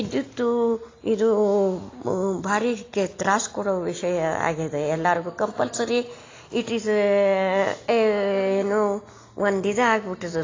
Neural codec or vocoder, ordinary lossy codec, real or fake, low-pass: none; AAC, 32 kbps; real; 7.2 kHz